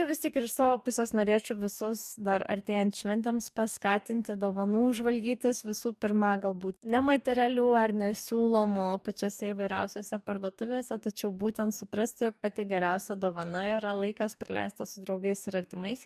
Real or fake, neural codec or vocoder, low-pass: fake; codec, 44.1 kHz, 2.6 kbps, DAC; 14.4 kHz